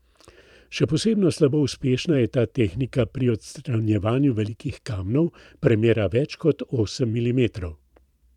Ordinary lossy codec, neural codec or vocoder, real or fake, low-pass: none; vocoder, 44.1 kHz, 128 mel bands every 512 samples, BigVGAN v2; fake; 19.8 kHz